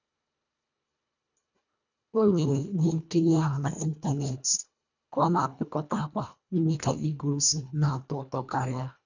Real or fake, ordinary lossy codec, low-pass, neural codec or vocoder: fake; none; 7.2 kHz; codec, 24 kHz, 1.5 kbps, HILCodec